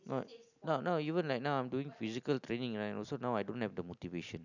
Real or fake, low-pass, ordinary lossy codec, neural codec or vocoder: real; 7.2 kHz; none; none